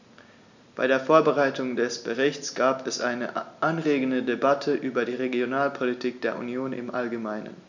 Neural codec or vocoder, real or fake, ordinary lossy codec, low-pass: none; real; none; 7.2 kHz